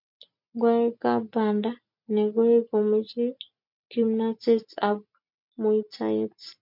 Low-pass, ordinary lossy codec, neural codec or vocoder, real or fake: 5.4 kHz; MP3, 48 kbps; none; real